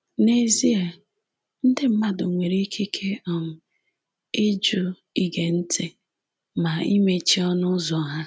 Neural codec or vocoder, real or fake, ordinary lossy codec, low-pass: none; real; none; none